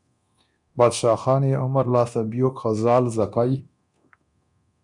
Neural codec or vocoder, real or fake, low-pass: codec, 24 kHz, 0.9 kbps, DualCodec; fake; 10.8 kHz